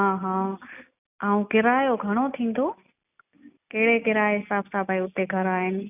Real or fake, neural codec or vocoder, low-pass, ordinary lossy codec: real; none; 3.6 kHz; none